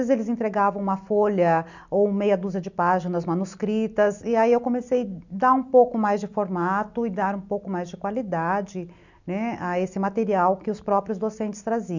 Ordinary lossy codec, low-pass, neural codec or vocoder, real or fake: none; 7.2 kHz; none; real